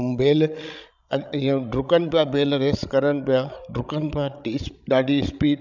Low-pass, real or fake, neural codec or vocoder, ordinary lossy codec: 7.2 kHz; fake; codec, 16 kHz, 16 kbps, FreqCodec, larger model; none